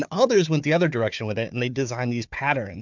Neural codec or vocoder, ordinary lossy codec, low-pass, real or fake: codec, 16 kHz, 4 kbps, FunCodec, trained on Chinese and English, 50 frames a second; MP3, 64 kbps; 7.2 kHz; fake